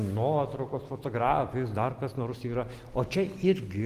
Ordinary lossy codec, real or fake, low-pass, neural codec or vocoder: Opus, 24 kbps; real; 14.4 kHz; none